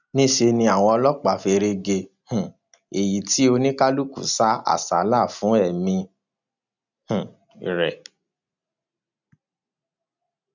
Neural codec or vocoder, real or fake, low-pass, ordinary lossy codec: none; real; 7.2 kHz; none